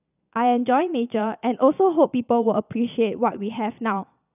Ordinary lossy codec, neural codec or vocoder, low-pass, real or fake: none; vocoder, 22.05 kHz, 80 mel bands, Vocos; 3.6 kHz; fake